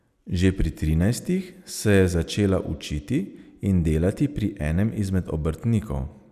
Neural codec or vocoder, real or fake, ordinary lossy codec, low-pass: none; real; none; 14.4 kHz